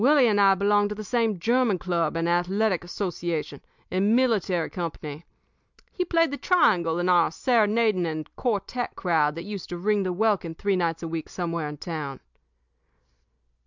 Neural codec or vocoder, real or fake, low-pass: none; real; 7.2 kHz